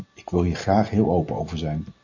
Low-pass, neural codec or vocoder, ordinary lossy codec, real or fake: 7.2 kHz; none; AAC, 48 kbps; real